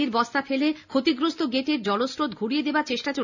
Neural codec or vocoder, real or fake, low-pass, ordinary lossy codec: none; real; 7.2 kHz; none